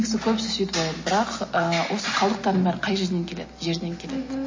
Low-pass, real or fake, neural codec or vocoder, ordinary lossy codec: 7.2 kHz; real; none; MP3, 32 kbps